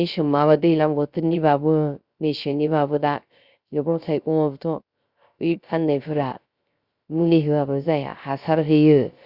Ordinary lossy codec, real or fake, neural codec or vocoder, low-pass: Opus, 64 kbps; fake; codec, 16 kHz, 0.3 kbps, FocalCodec; 5.4 kHz